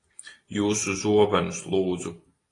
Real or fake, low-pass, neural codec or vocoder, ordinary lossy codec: real; 10.8 kHz; none; AAC, 32 kbps